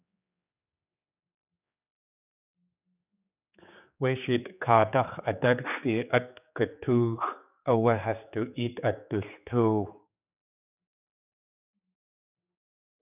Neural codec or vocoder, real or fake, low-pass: codec, 16 kHz, 4 kbps, X-Codec, HuBERT features, trained on general audio; fake; 3.6 kHz